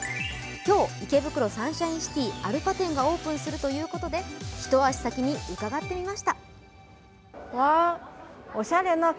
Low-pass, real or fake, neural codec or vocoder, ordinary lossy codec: none; real; none; none